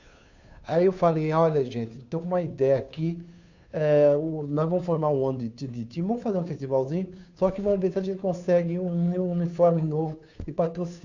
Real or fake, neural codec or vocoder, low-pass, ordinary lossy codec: fake; codec, 16 kHz, 2 kbps, FunCodec, trained on Chinese and English, 25 frames a second; 7.2 kHz; none